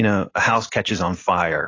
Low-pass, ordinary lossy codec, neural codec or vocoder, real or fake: 7.2 kHz; AAC, 32 kbps; none; real